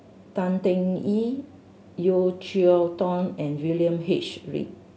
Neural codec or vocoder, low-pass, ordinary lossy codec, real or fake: none; none; none; real